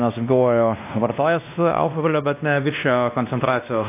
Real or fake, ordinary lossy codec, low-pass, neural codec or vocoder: fake; AAC, 32 kbps; 3.6 kHz; codec, 16 kHz, 1 kbps, X-Codec, WavLM features, trained on Multilingual LibriSpeech